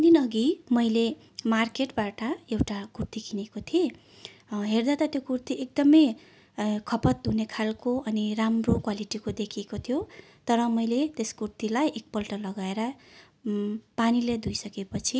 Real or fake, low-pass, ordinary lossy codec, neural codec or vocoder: real; none; none; none